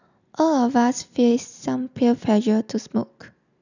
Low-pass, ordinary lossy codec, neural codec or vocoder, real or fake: 7.2 kHz; none; none; real